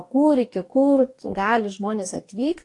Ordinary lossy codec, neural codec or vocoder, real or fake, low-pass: AAC, 48 kbps; codec, 44.1 kHz, 2.6 kbps, DAC; fake; 10.8 kHz